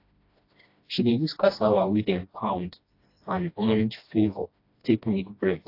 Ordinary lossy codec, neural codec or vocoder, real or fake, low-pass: AAC, 32 kbps; codec, 16 kHz, 1 kbps, FreqCodec, smaller model; fake; 5.4 kHz